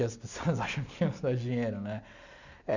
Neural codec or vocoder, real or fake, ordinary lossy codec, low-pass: none; real; none; 7.2 kHz